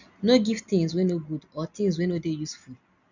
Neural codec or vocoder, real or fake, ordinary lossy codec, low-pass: none; real; none; 7.2 kHz